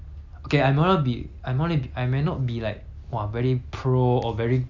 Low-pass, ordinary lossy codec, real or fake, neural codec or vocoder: 7.2 kHz; MP3, 64 kbps; real; none